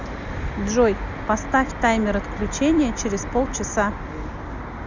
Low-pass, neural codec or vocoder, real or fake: 7.2 kHz; none; real